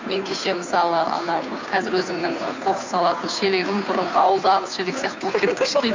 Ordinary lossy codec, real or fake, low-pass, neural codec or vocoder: MP3, 64 kbps; fake; 7.2 kHz; codec, 16 kHz, 2 kbps, FunCodec, trained on Chinese and English, 25 frames a second